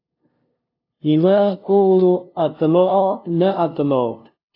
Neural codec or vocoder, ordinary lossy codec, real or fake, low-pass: codec, 16 kHz, 0.5 kbps, FunCodec, trained on LibriTTS, 25 frames a second; AAC, 32 kbps; fake; 5.4 kHz